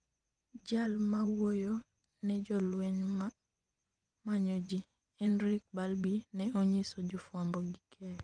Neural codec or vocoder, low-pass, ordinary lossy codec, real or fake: vocoder, 24 kHz, 100 mel bands, Vocos; 9.9 kHz; Opus, 32 kbps; fake